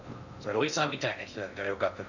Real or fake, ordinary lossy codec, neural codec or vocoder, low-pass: fake; none; codec, 16 kHz in and 24 kHz out, 0.6 kbps, FocalCodec, streaming, 2048 codes; 7.2 kHz